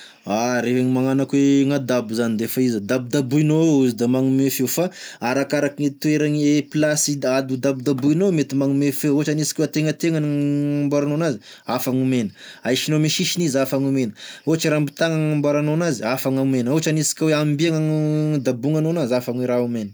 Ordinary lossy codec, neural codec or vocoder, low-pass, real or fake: none; none; none; real